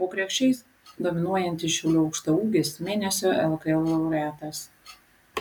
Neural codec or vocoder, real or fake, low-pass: none; real; 19.8 kHz